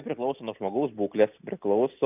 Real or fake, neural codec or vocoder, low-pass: real; none; 3.6 kHz